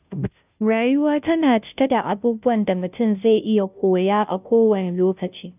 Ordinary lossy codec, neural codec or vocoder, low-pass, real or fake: none; codec, 16 kHz, 0.5 kbps, FunCodec, trained on Chinese and English, 25 frames a second; 3.6 kHz; fake